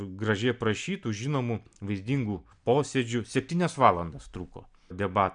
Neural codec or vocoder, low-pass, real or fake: none; 9.9 kHz; real